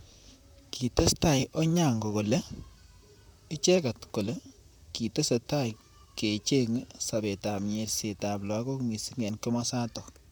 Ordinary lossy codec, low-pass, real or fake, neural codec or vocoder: none; none; fake; vocoder, 44.1 kHz, 128 mel bands, Pupu-Vocoder